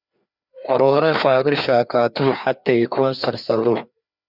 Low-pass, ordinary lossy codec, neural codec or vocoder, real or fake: 5.4 kHz; Opus, 64 kbps; codec, 16 kHz, 2 kbps, FreqCodec, larger model; fake